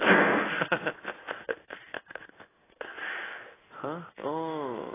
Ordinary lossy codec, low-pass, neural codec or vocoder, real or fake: AAC, 16 kbps; 3.6 kHz; codec, 16 kHz in and 24 kHz out, 1 kbps, XY-Tokenizer; fake